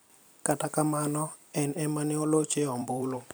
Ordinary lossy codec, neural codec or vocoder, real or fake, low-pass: none; vocoder, 44.1 kHz, 128 mel bands every 256 samples, BigVGAN v2; fake; none